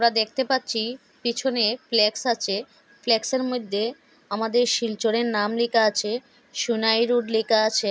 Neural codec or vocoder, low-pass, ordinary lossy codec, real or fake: none; none; none; real